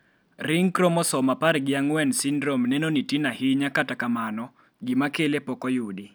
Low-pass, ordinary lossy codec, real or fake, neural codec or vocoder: none; none; real; none